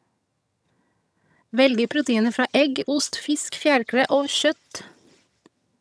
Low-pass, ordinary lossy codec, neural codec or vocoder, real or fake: none; none; vocoder, 22.05 kHz, 80 mel bands, HiFi-GAN; fake